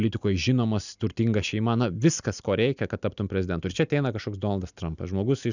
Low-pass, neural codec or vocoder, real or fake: 7.2 kHz; none; real